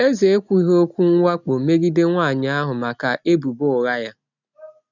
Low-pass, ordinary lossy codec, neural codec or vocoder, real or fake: 7.2 kHz; none; none; real